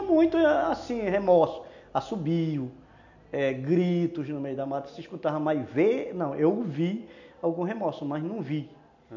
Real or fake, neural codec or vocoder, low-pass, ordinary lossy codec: real; none; 7.2 kHz; none